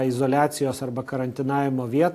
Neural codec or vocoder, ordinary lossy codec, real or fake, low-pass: none; AAC, 64 kbps; real; 14.4 kHz